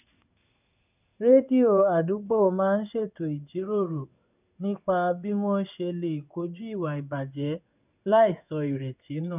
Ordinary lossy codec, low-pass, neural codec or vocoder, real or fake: none; 3.6 kHz; codec, 16 kHz in and 24 kHz out, 1 kbps, XY-Tokenizer; fake